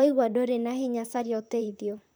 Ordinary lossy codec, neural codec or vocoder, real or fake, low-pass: none; vocoder, 44.1 kHz, 128 mel bands, Pupu-Vocoder; fake; none